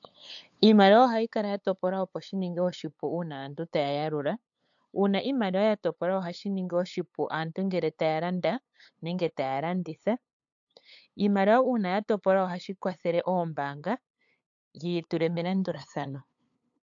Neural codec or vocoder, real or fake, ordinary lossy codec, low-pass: codec, 16 kHz, 8 kbps, FunCodec, trained on LibriTTS, 25 frames a second; fake; AAC, 64 kbps; 7.2 kHz